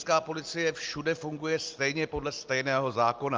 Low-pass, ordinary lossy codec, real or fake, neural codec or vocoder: 7.2 kHz; Opus, 24 kbps; real; none